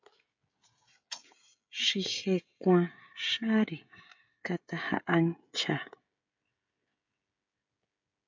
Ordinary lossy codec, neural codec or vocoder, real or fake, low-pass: MP3, 64 kbps; codec, 16 kHz, 16 kbps, FreqCodec, smaller model; fake; 7.2 kHz